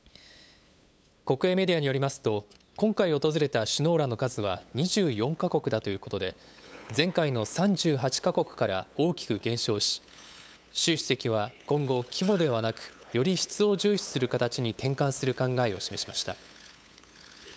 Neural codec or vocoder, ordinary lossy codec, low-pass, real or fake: codec, 16 kHz, 8 kbps, FunCodec, trained on LibriTTS, 25 frames a second; none; none; fake